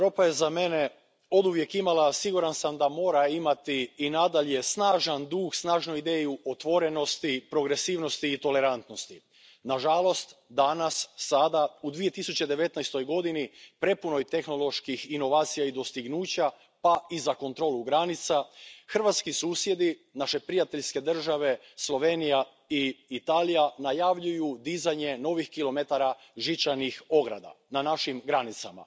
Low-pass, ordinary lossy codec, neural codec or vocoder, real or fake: none; none; none; real